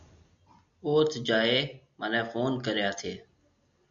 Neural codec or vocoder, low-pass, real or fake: none; 7.2 kHz; real